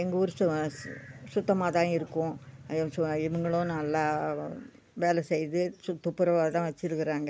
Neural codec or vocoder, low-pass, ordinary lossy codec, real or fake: none; none; none; real